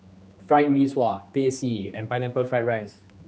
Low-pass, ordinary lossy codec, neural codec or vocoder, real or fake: none; none; codec, 16 kHz, 2 kbps, X-Codec, HuBERT features, trained on general audio; fake